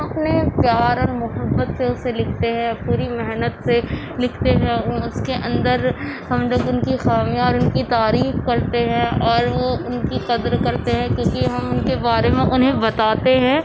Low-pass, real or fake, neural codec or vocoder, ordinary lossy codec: none; real; none; none